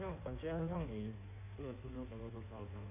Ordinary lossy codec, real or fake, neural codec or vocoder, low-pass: none; fake; codec, 16 kHz in and 24 kHz out, 1.1 kbps, FireRedTTS-2 codec; 3.6 kHz